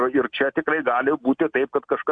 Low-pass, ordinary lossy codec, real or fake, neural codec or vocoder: 9.9 kHz; MP3, 64 kbps; real; none